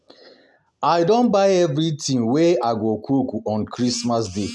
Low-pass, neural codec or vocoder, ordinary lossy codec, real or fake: none; none; none; real